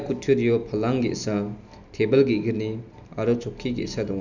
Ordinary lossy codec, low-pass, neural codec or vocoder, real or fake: none; 7.2 kHz; none; real